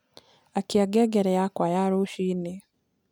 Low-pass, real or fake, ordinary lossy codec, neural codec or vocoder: 19.8 kHz; real; none; none